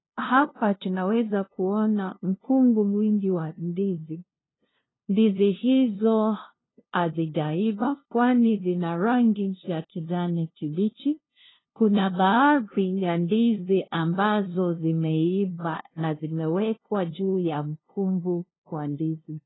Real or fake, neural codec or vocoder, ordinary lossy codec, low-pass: fake; codec, 16 kHz, 0.5 kbps, FunCodec, trained on LibriTTS, 25 frames a second; AAC, 16 kbps; 7.2 kHz